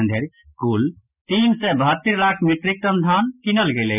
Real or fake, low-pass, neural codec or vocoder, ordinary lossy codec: real; 3.6 kHz; none; none